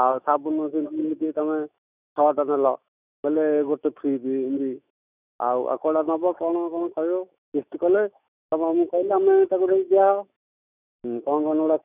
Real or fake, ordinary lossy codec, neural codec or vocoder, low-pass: fake; none; autoencoder, 48 kHz, 128 numbers a frame, DAC-VAE, trained on Japanese speech; 3.6 kHz